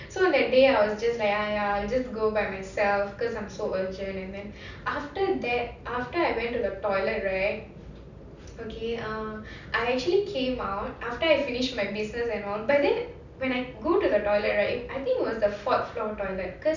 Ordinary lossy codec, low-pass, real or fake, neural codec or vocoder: none; 7.2 kHz; real; none